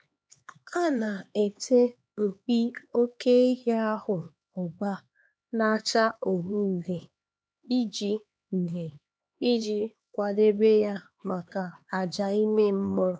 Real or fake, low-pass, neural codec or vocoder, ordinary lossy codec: fake; none; codec, 16 kHz, 2 kbps, X-Codec, HuBERT features, trained on LibriSpeech; none